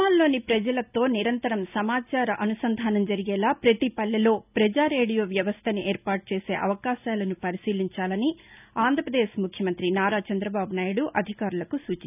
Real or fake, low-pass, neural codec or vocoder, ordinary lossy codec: real; 3.6 kHz; none; none